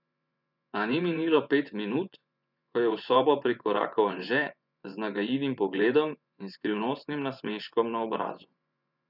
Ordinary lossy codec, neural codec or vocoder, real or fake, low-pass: none; vocoder, 24 kHz, 100 mel bands, Vocos; fake; 5.4 kHz